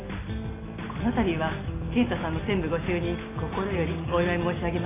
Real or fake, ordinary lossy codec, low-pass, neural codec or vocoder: real; AAC, 16 kbps; 3.6 kHz; none